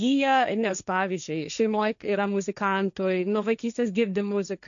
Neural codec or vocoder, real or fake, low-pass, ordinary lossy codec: codec, 16 kHz, 1.1 kbps, Voila-Tokenizer; fake; 7.2 kHz; MP3, 96 kbps